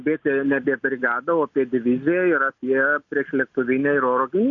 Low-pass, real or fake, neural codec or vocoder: 7.2 kHz; real; none